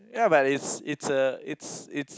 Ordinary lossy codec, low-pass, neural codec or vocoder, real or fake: none; none; none; real